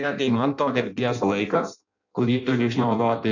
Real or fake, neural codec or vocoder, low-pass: fake; codec, 16 kHz in and 24 kHz out, 0.6 kbps, FireRedTTS-2 codec; 7.2 kHz